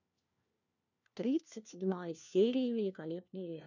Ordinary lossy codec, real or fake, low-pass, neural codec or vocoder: none; fake; 7.2 kHz; codec, 16 kHz, 1 kbps, FunCodec, trained on LibriTTS, 50 frames a second